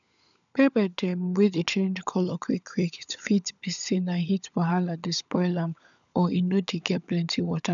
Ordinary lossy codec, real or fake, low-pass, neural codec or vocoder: none; fake; 7.2 kHz; codec, 16 kHz, 16 kbps, FunCodec, trained on LibriTTS, 50 frames a second